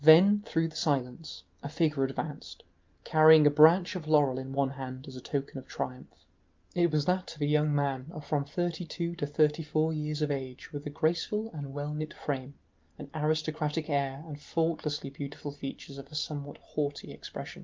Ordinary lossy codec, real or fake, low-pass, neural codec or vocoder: Opus, 24 kbps; real; 7.2 kHz; none